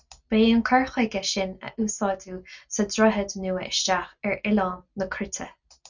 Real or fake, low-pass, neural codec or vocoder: real; 7.2 kHz; none